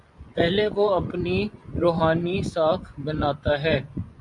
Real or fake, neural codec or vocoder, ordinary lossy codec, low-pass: fake; vocoder, 24 kHz, 100 mel bands, Vocos; MP3, 96 kbps; 10.8 kHz